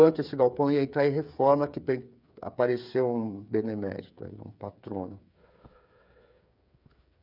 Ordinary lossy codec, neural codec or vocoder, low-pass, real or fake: none; codec, 16 kHz, 8 kbps, FreqCodec, smaller model; 5.4 kHz; fake